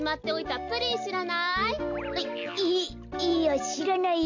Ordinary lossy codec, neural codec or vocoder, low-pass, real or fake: none; none; 7.2 kHz; real